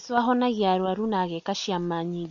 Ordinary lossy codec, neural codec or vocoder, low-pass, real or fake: none; none; 7.2 kHz; real